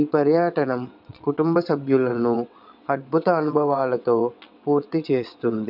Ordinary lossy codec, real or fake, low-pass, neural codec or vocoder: none; fake; 5.4 kHz; vocoder, 22.05 kHz, 80 mel bands, WaveNeXt